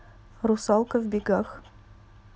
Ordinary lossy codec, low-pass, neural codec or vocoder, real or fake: none; none; none; real